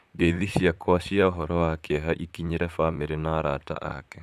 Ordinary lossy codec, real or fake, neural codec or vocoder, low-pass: none; fake; vocoder, 44.1 kHz, 128 mel bands, Pupu-Vocoder; 14.4 kHz